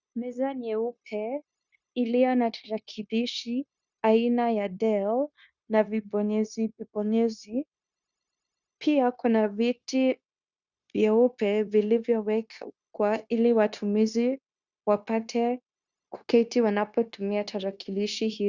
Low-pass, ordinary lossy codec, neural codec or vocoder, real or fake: 7.2 kHz; Opus, 64 kbps; codec, 16 kHz, 0.9 kbps, LongCat-Audio-Codec; fake